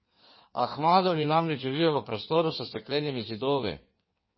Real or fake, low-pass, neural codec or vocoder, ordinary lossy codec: fake; 7.2 kHz; codec, 16 kHz in and 24 kHz out, 1.1 kbps, FireRedTTS-2 codec; MP3, 24 kbps